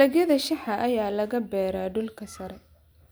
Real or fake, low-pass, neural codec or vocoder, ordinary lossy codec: real; none; none; none